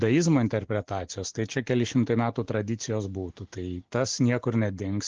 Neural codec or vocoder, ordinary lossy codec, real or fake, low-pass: none; Opus, 16 kbps; real; 7.2 kHz